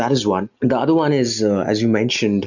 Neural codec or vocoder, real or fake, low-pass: none; real; 7.2 kHz